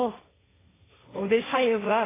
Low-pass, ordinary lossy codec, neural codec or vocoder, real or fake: 3.6 kHz; AAC, 16 kbps; codec, 16 kHz, 1.1 kbps, Voila-Tokenizer; fake